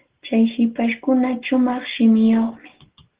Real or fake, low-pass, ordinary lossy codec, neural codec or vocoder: real; 3.6 kHz; Opus, 16 kbps; none